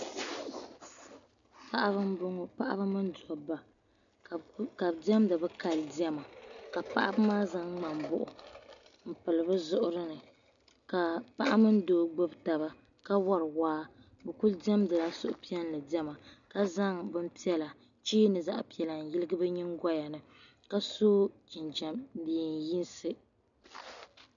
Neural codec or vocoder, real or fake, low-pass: none; real; 7.2 kHz